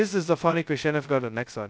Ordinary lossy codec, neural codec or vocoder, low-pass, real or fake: none; codec, 16 kHz, 0.2 kbps, FocalCodec; none; fake